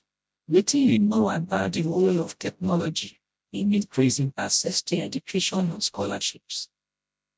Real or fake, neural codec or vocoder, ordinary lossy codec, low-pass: fake; codec, 16 kHz, 0.5 kbps, FreqCodec, smaller model; none; none